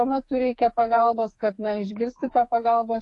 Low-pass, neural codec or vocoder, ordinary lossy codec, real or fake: 10.8 kHz; codec, 44.1 kHz, 2.6 kbps, SNAC; AAC, 48 kbps; fake